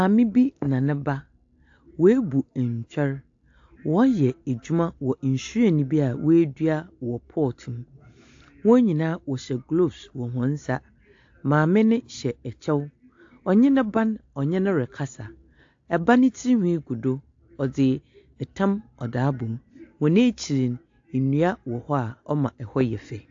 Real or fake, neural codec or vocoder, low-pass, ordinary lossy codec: real; none; 7.2 kHz; AAC, 48 kbps